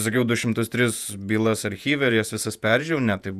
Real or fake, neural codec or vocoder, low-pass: fake; vocoder, 48 kHz, 128 mel bands, Vocos; 14.4 kHz